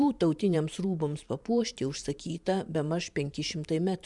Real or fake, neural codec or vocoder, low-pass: fake; vocoder, 44.1 kHz, 128 mel bands every 512 samples, BigVGAN v2; 10.8 kHz